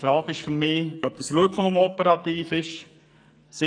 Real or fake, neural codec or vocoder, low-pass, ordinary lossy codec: fake; codec, 44.1 kHz, 2.6 kbps, SNAC; 9.9 kHz; none